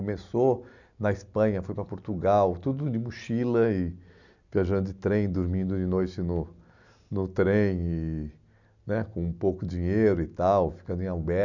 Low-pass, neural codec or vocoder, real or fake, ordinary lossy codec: 7.2 kHz; none; real; none